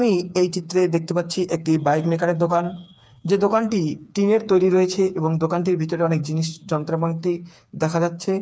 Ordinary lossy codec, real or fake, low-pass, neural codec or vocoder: none; fake; none; codec, 16 kHz, 4 kbps, FreqCodec, smaller model